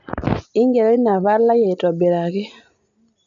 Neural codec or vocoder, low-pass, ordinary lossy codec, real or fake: none; 7.2 kHz; none; real